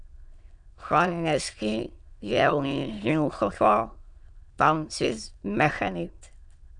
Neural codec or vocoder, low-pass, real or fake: autoencoder, 22.05 kHz, a latent of 192 numbers a frame, VITS, trained on many speakers; 9.9 kHz; fake